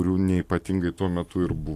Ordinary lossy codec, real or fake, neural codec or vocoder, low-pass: AAC, 64 kbps; real; none; 14.4 kHz